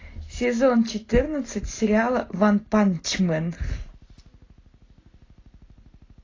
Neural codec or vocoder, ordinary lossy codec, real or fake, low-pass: none; AAC, 32 kbps; real; 7.2 kHz